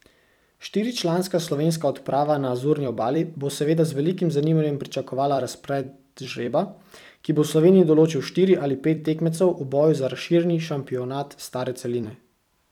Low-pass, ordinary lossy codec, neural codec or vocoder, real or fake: 19.8 kHz; none; vocoder, 44.1 kHz, 128 mel bands every 256 samples, BigVGAN v2; fake